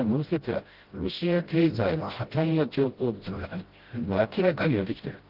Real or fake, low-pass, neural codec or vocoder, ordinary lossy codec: fake; 5.4 kHz; codec, 16 kHz, 0.5 kbps, FreqCodec, smaller model; Opus, 16 kbps